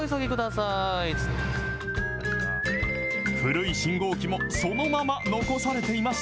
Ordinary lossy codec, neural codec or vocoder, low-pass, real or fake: none; none; none; real